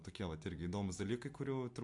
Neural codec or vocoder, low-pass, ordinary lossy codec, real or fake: none; 10.8 kHz; MP3, 64 kbps; real